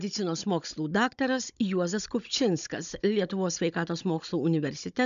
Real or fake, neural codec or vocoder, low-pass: fake; codec, 16 kHz, 16 kbps, FunCodec, trained on Chinese and English, 50 frames a second; 7.2 kHz